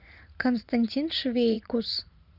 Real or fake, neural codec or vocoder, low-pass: fake; vocoder, 44.1 kHz, 80 mel bands, Vocos; 5.4 kHz